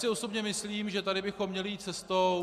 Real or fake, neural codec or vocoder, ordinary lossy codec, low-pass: real; none; MP3, 96 kbps; 14.4 kHz